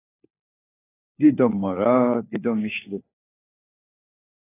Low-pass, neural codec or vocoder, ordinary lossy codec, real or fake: 3.6 kHz; codec, 16 kHz, 4 kbps, FunCodec, trained on LibriTTS, 50 frames a second; AAC, 24 kbps; fake